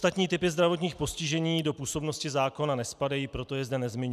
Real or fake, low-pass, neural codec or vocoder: real; 14.4 kHz; none